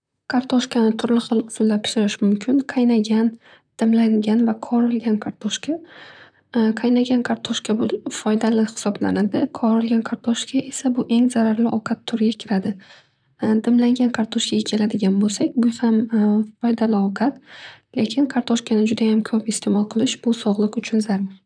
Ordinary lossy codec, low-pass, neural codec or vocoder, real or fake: none; 9.9 kHz; vocoder, 44.1 kHz, 128 mel bands, Pupu-Vocoder; fake